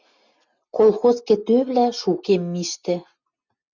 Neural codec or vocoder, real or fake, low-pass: none; real; 7.2 kHz